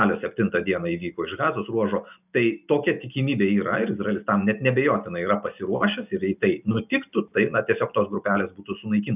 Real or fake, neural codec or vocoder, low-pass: real; none; 3.6 kHz